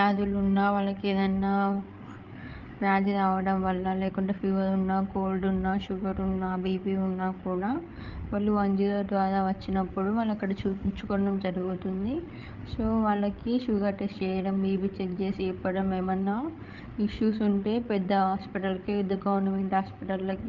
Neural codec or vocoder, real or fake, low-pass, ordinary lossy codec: codec, 16 kHz, 8 kbps, FreqCodec, larger model; fake; 7.2 kHz; Opus, 24 kbps